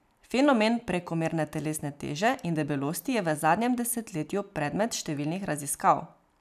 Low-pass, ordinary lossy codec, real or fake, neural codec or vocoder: 14.4 kHz; none; real; none